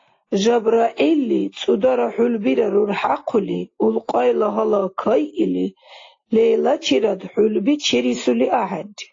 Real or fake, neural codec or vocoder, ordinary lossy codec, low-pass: real; none; AAC, 32 kbps; 7.2 kHz